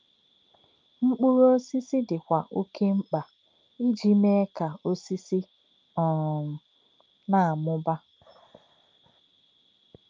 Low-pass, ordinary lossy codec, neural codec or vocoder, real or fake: 7.2 kHz; Opus, 24 kbps; none; real